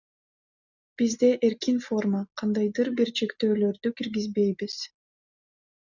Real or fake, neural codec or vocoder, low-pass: real; none; 7.2 kHz